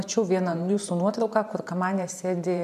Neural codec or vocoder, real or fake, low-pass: vocoder, 44.1 kHz, 128 mel bands every 512 samples, BigVGAN v2; fake; 14.4 kHz